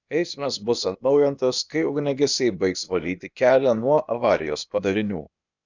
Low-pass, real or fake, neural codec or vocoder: 7.2 kHz; fake; codec, 16 kHz, 0.8 kbps, ZipCodec